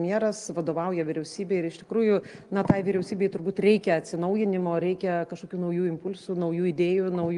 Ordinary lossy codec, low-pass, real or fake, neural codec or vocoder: Opus, 24 kbps; 10.8 kHz; real; none